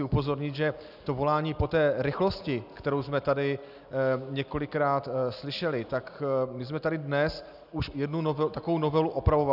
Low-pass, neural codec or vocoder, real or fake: 5.4 kHz; none; real